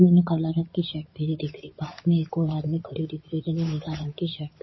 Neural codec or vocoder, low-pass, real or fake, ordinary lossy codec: codec, 16 kHz in and 24 kHz out, 2.2 kbps, FireRedTTS-2 codec; 7.2 kHz; fake; MP3, 24 kbps